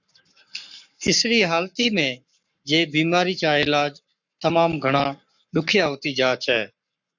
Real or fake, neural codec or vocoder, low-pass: fake; codec, 44.1 kHz, 7.8 kbps, Pupu-Codec; 7.2 kHz